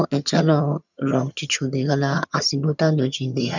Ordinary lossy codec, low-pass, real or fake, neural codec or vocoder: none; 7.2 kHz; fake; vocoder, 22.05 kHz, 80 mel bands, HiFi-GAN